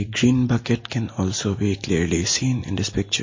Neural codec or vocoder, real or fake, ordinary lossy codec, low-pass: none; real; MP3, 32 kbps; 7.2 kHz